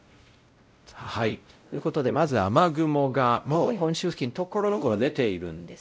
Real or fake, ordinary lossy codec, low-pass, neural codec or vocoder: fake; none; none; codec, 16 kHz, 0.5 kbps, X-Codec, WavLM features, trained on Multilingual LibriSpeech